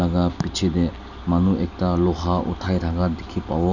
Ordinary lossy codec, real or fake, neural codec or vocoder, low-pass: none; real; none; 7.2 kHz